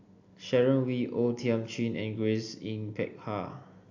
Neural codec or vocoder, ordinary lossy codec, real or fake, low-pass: none; AAC, 48 kbps; real; 7.2 kHz